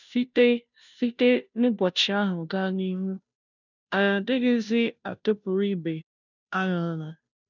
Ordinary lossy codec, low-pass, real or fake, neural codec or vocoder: none; 7.2 kHz; fake; codec, 16 kHz, 0.5 kbps, FunCodec, trained on Chinese and English, 25 frames a second